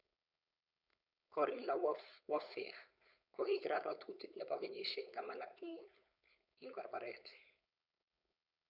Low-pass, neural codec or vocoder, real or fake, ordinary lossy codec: 5.4 kHz; codec, 16 kHz, 4.8 kbps, FACodec; fake; none